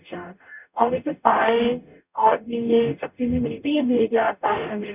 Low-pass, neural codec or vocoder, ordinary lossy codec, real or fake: 3.6 kHz; codec, 44.1 kHz, 0.9 kbps, DAC; none; fake